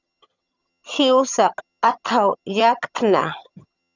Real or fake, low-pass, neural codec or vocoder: fake; 7.2 kHz; vocoder, 22.05 kHz, 80 mel bands, HiFi-GAN